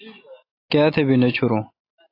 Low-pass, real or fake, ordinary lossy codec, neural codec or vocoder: 5.4 kHz; real; AAC, 32 kbps; none